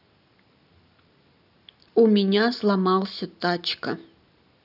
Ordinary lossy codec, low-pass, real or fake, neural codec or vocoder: AAC, 48 kbps; 5.4 kHz; real; none